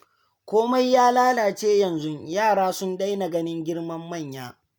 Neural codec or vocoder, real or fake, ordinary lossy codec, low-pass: none; real; none; none